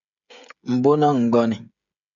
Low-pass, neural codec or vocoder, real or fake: 7.2 kHz; codec, 16 kHz, 16 kbps, FreqCodec, smaller model; fake